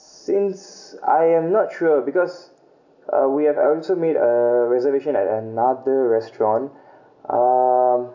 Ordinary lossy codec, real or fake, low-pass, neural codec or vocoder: none; real; 7.2 kHz; none